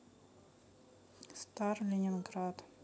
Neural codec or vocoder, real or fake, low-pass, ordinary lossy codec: none; real; none; none